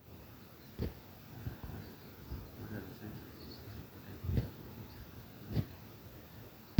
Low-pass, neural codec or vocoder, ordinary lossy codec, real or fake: none; none; none; real